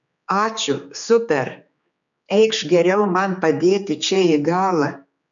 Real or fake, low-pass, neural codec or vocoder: fake; 7.2 kHz; codec, 16 kHz, 4 kbps, X-Codec, HuBERT features, trained on general audio